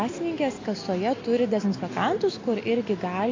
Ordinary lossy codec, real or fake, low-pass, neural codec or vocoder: AAC, 32 kbps; real; 7.2 kHz; none